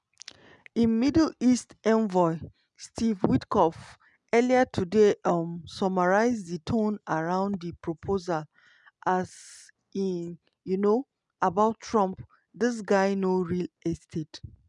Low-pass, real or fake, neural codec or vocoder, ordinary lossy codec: 10.8 kHz; real; none; none